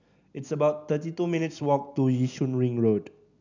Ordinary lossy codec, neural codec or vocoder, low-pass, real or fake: none; none; 7.2 kHz; real